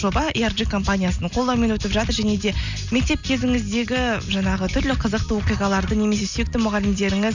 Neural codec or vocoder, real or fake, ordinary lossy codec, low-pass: none; real; none; 7.2 kHz